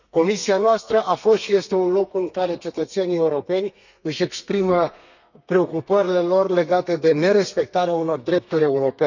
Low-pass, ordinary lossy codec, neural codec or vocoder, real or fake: 7.2 kHz; none; codec, 32 kHz, 1.9 kbps, SNAC; fake